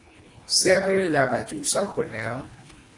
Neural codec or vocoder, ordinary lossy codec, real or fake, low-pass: codec, 24 kHz, 1.5 kbps, HILCodec; AAC, 48 kbps; fake; 10.8 kHz